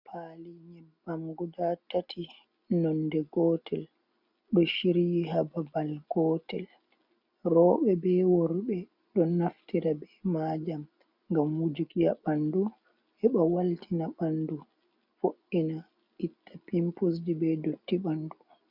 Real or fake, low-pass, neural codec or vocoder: real; 5.4 kHz; none